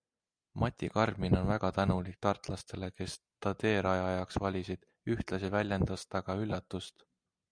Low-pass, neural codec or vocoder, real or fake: 9.9 kHz; none; real